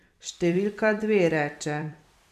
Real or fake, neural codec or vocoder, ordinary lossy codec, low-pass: fake; vocoder, 44.1 kHz, 128 mel bands every 256 samples, BigVGAN v2; none; 14.4 kHz